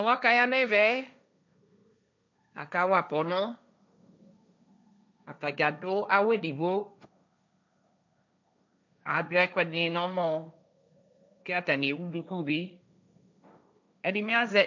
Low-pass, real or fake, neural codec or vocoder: 7.2 kHz; fake; codec, 16 kHz, 1.1 kbps, Voila-Tokenizer